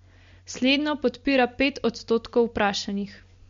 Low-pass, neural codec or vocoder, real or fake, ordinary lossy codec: 7.2 kHz; none; real; MP3, 48 kbps